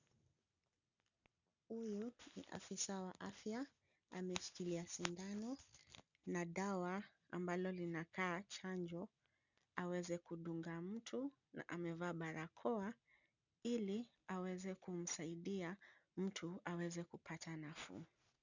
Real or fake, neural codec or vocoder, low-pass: real; none; 7.2 kHz